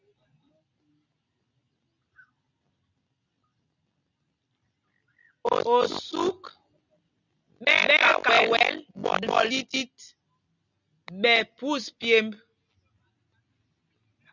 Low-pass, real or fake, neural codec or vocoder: 7.2 kHz; real; none